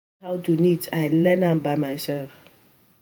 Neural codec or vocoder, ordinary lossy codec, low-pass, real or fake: vocoder, 48 kHz, 128 mel bands, Vocos; none; none; fake